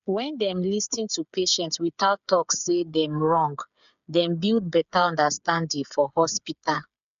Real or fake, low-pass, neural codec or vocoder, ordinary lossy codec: fake; 7.2 kHz; codec, 16 kHz, 8 kbps, FreqCodec, smaller model; MP3, 96 kbps